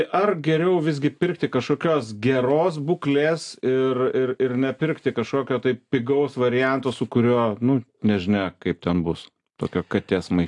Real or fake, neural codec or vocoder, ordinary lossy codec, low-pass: real; none; AAC, 64 kbps; 10.8 kHz